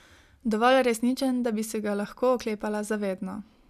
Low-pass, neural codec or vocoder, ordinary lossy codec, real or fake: 14.4 kHz; none; none; real